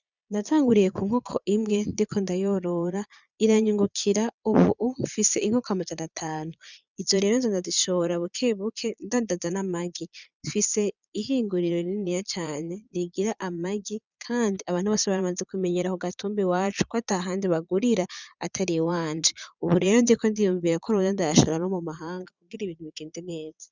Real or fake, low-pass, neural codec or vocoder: fake; 7.2 kHz; vocoder, 22.05 kHz, 80 mel bands, Vocos